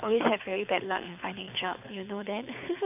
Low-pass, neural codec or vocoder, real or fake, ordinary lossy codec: 3.6 kHz; codec, 24 kHz, 6 kbps, HILCodec; fake; none